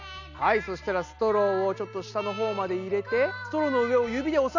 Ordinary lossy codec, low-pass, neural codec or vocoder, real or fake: none; 7.2 kHz; none; real